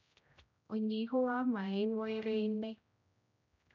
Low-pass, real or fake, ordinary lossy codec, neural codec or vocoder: 7.2 kHz; fake; none; codec, 16 kHz, 0.5 kbps, X-Codec, HuBERT features, trained on general audio